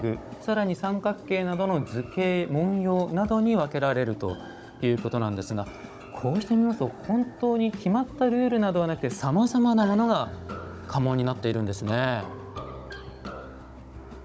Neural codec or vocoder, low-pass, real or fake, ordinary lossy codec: codec, 16 kHz, 16 kbps, FunCodec, trained on Chinese and English, 50 frames a second; none; fake; none